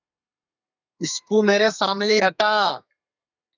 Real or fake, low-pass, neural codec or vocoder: fake; 7.2 kHz; codec, 32 kHz, 1.9 kbps, SNAC